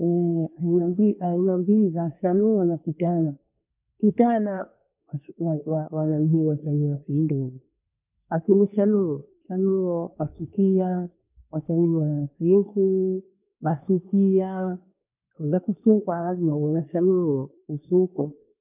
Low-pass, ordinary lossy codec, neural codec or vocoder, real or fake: 3.6 kHz; none; codec, 24 kHz, 1 kbps, SNAC; fake